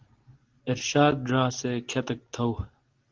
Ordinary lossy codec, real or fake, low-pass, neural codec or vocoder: Opus, 16 kbps; real; 7.2 kHz; none